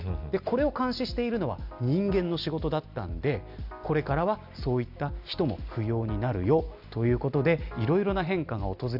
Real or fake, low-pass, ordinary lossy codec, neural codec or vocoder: real; 5.4 kHz; none; none